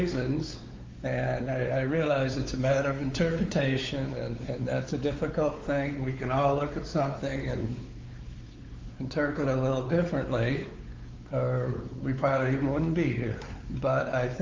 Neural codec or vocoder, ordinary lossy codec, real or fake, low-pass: codec, 16 kHz, 4 kbps, X-Codec, WavLM features, trained on Multilingual LibriSpeech; Opus, 32 kbps; fake; 7.2 kHz